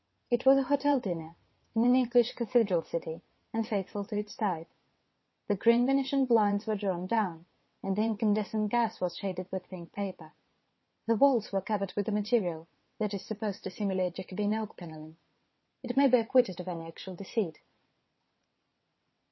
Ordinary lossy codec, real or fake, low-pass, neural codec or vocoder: MP3, 24 kbps; fake; 7.2 kHz; vocoder, 22.05 kHz, 80 mel bands, WaveNeXt